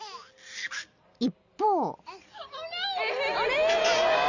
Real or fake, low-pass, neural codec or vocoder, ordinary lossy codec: real; 7.2 kHz; none; none